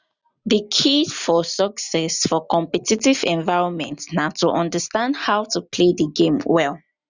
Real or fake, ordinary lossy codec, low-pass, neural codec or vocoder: real; none; 7.2 kHz; none